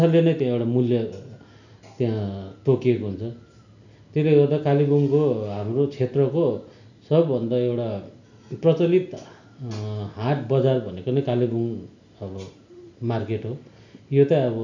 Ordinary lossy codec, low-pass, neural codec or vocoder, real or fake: none; 7.2 kHz; none; real